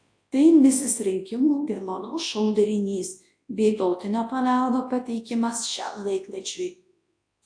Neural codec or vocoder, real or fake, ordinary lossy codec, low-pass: codec, 24 kHz, 0.9 kbps, WavTokenizer, large speech release; fake; AAC, 48 kbps; 9.9 kHz